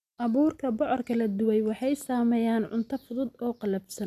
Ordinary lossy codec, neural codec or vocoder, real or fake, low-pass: none; none; real; 14.4 kHz